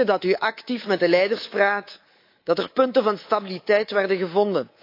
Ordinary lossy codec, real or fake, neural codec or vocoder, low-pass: AAC, 32 kbps; fake; codec, 24 kHz, 3.1 kbps, DualCodec; 5.4 kHz